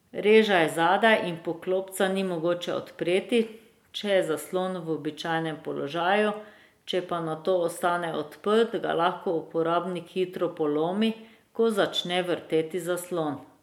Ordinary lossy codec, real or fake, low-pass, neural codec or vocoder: MP3, 96 kbps; real; 19.8 kHz; none